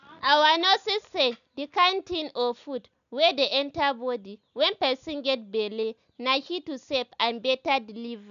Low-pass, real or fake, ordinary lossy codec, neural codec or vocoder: 7.2 kHz; real; none; none